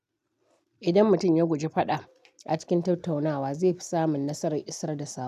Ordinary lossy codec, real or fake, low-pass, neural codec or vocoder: none; real; 14.4 kHz; none